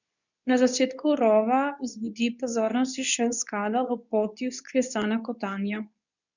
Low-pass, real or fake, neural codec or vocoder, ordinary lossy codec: 7.2 kHz; fake; codec, 24 kHz, 0.9 kbps, WavTokenizer, medium speech release version 2; none